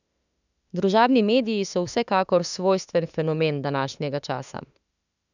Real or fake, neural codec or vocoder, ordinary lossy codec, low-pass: fake; autoencoder, 48 kHz, 32 numbers a frame, DAC-VAE, trained on Japanese speech; none; 7.2 kHz